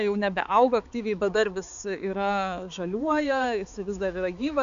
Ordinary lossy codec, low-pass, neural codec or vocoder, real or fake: AAC, 96 kbps; 7.2 kHz; codec, 16 kHz, 4 kbps, X-Codec, HuBERT features, trained on balanced general audio; fake